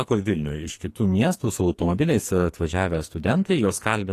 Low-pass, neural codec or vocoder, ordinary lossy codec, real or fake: 14.4 kHz; codec, 44.1 kHz, 2.6 kbps, SNAC; AAC, 48 kbps; fake